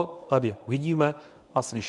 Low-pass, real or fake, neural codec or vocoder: 10.8 kHz; fake; codec, 24 kHz, 0.9 kbps, WavTokenizer, medium speech release version 1